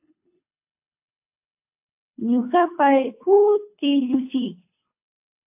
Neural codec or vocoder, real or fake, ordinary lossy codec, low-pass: codec, 24 kHz, 3 kbps, HILCodec; fake; AAC, 24 kbps; 3.6 kHz